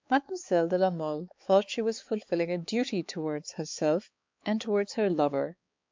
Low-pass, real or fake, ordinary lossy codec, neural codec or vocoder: 7.2 kHz; fake; MP3, 64 kbps; codec, 16 kHz, 4 kbps, X-Codec, HuBERT features, trained on balanced general audio